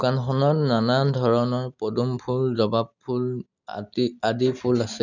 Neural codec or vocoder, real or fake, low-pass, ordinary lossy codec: none; real; 7.2 kHz; none